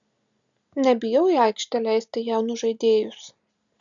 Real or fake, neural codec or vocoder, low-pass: real; none; 7.2 kHz